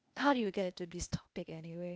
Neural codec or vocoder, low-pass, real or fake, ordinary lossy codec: codec, 16 kHz, 0.8 kbps, ZipCodec; none; fake; none